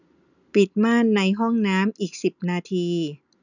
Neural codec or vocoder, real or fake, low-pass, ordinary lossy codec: none; real; 7.2 kHz; none